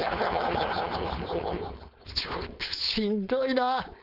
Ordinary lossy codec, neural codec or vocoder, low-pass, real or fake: none; codec, 16 kHz, 4.8 kbps, FACodec; 5.4 kHz; fake